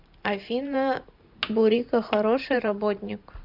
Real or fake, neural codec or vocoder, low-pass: fake; vocoder, 44.1 kHz, 128 mel bands every 512 samples, BigVGAN v2; 5.4 kHz